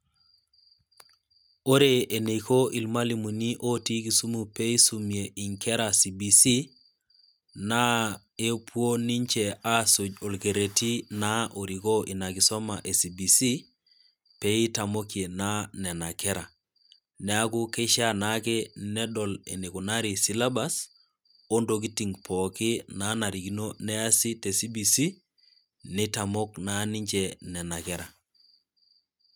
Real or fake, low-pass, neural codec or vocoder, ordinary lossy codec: real; none; none; none